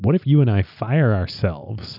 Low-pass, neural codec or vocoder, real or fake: 5.4 kHz; none; real